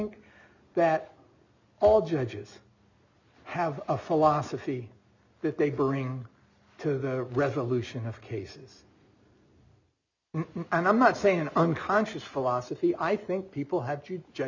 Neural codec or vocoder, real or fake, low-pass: none; real; 7.2 kHz